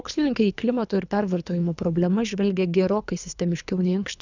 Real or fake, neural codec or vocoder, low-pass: fake; codec, 24 kHz, 3 kbps, HILCodec; 7.2 kHz